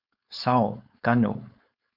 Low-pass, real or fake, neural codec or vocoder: 5.4 kHz; fake; codec, 16 kHz, 4.8 kbps, FACodec